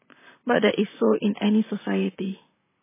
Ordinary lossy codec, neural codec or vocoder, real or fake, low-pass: MP3, 16 kbps; vocoder, 44.1 kHz, 128 mel bands, Pupu-Vocoder; fake; 3.6 kHz